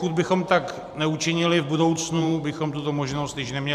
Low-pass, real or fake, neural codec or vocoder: 14.4 kHz; fake; vocoder, 44.1 kHz, 128 mel bands every 512 samples, BigVGAN v2